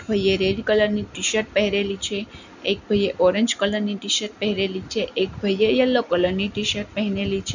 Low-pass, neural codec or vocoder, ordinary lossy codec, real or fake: 7.2 kHz; none; none; real